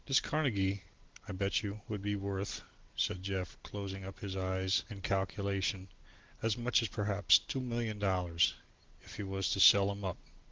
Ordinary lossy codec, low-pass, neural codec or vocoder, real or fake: Opus, 16 kbps; 7.2 kHz; none; real